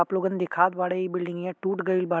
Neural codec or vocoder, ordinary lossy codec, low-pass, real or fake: none; none; none; real